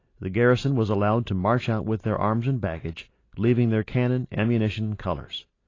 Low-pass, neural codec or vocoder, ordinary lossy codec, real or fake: 7.2 kHz; none; AAC, 32 kbps; real